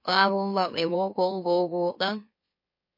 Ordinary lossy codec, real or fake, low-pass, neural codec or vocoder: MP3, 32 kbps; fake; 5.4 kHz; autoencoder, 44.1 kHz, a latent of 192 numbers a frame, MeloTTS